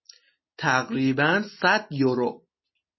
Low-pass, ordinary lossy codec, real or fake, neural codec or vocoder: 7.2 kHz; MP3, 24 kbps; real; none